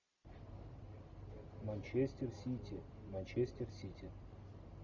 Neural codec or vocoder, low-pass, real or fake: none; 7.2 kHz; real